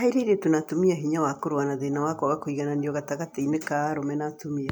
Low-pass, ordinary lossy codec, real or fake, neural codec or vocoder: none; none; real; none